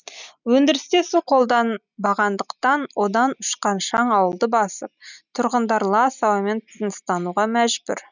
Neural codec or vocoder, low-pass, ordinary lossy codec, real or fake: none; 7.2 kHz; none; real